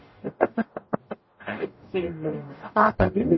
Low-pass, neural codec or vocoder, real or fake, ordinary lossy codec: 7.2 kHz; codec, 44.1 kHz, 0.9 kbps, DAC; fake; MP3, 24 kbps